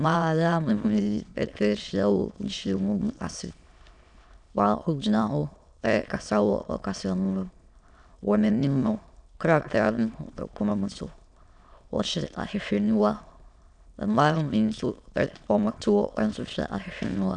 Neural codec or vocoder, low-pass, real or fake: autoencoder, 22.05 kHz, a latent of 192 numbers a frame, VITS, trained on many speakers; 9.9 kHz; fake